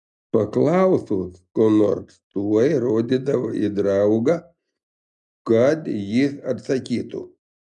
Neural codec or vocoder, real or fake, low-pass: none; real; 10.8 kHz